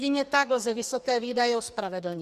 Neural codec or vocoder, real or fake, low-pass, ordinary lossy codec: codec, 44.1 kHz, 2.6 kbps, SNAC; fake; 14.4 kHz; Opus, 64 kbps